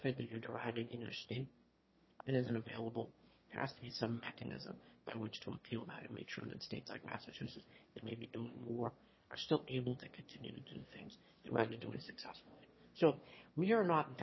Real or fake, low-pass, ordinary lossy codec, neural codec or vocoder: fake; 7.2 kHz; MP3, 24 kbps; autoencoder, 22.05 kHz, a latent of 192 numbers a frame, VITS, trained on one speaker